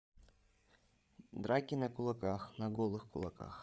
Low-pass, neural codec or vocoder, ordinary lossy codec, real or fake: none; codec, 16 kHz, 8 kbps, FreqCodec, larger model; none; fake